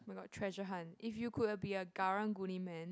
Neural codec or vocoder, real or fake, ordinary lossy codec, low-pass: none; real; none; none